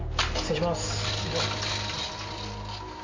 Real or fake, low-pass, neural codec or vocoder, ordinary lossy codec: real; 7.2 kHz; none; MP3, 48 kbps